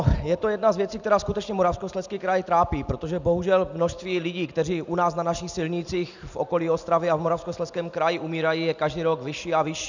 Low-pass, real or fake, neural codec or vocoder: 7.2 kHz; real; none